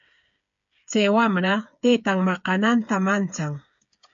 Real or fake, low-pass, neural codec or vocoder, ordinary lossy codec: fake; 7.2 kHz; codec, 16 kHz, 8 kbps, FreqCodec, smaller model; MP3, 64 kbps